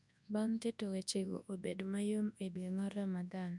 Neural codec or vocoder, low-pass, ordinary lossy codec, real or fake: codec, 24 kHz, 0.9 kbps, WavTokenizer, large speech release; 10.8 kHz; none; fake